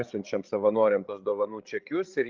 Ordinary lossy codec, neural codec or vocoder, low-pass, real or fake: Opus, 32 kbps; codec, 16 kHz, 8 kbps, FreqCodec, larger model; 7.2 kHz; fake